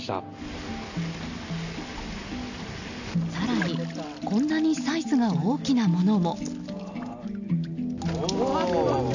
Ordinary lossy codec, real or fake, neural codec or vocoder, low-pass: none; real; none; 7.2 kHz